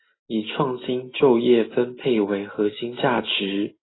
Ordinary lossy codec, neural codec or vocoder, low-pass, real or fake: AAC, 16 kbps; none; 7.2 kHz; real